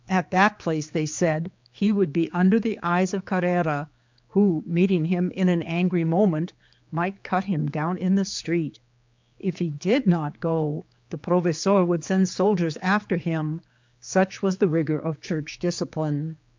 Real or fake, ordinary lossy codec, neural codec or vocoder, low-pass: fake; MP3, 64 kbps; codec, 16 kHz, 4 kbps, X-Codec, HuBERT features, trained on general audio; 7.2 kHz